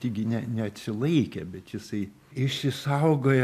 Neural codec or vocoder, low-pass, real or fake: none; 14.4 kHz; real